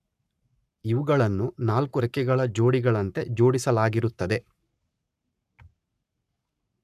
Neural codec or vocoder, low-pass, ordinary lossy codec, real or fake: codec, 44.1 kHz, 7.8 kbps, Pupu-Codec; 14.4 kHz; none; fake